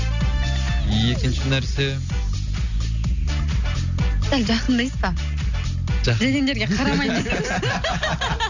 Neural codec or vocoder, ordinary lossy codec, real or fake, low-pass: none; none; real; 7.2 kHz